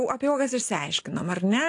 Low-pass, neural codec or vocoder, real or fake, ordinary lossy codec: 10.8 kHz; none; real; AAC, 48 kbps